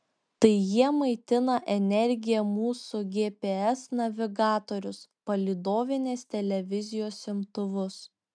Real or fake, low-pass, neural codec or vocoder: real; 9.9 kHz; none